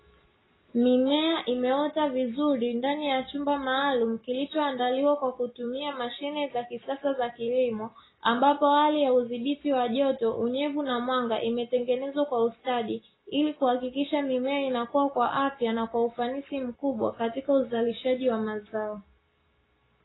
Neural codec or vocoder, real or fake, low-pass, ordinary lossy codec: none; real; 7.2 kHz; AAC, 16 kbps